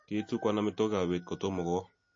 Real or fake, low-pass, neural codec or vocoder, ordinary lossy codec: real; 7.2 kHz; none; MP3, 32 kbps